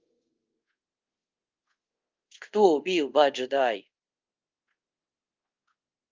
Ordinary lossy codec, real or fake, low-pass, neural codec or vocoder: Opus, 24 kbps; fake; 7.2 kHz; codec, 24 kHz, 0.5 kbps, DualCodec